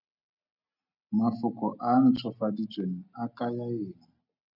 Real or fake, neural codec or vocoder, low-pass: real; none; 5.4 kHz